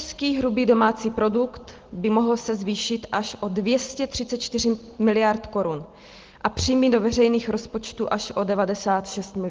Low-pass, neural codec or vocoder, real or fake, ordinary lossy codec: 7.2 kHz; none; real; Opus, 16 kbps